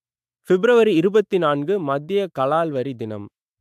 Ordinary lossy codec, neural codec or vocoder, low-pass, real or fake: AAC, 96 kbps; autoencoder, 48 kHz, 128 numbers a frame, DAC-VAE, trained on Japanese speech; 14.4 kHz; fake